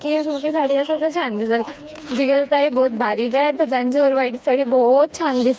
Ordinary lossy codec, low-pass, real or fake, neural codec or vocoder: none; none; fake; codec, 16 kHz, 2 kbps, FreqCodec, smaller model